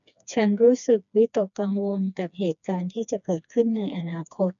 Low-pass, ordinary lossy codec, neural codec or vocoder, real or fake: 7.2 kHz; none; codec, 16 kHz, 2 kbps, FreqCodec, smaller model; fake